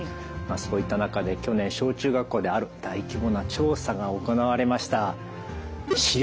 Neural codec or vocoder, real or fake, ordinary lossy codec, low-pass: none; real; none; none